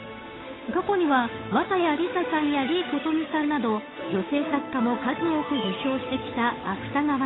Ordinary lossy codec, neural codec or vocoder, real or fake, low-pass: AAC, 16 kbps; codec, 16 kHz, 8 kbps, FunCodec, trained on Chinese and English, 25 frames a second; fake; 7.2 kHz